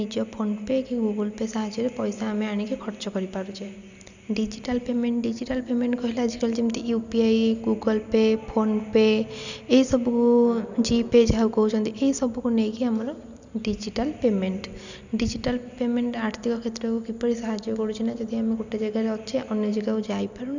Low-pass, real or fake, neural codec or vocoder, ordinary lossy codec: 7.2 kHz; real; none; none